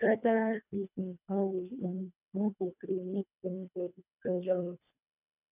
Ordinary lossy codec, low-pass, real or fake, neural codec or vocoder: none; 3.6 kHz; fake; codec, 24 kHz, 1.5 kbps, HILCodec